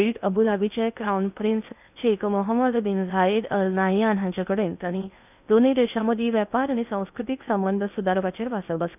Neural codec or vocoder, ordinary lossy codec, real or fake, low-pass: codec, 16 kHz in and 24 kHz out, 0.8 kbps, FocalCodec, streaming, 65536 codes; none; fake; 3.6 kHz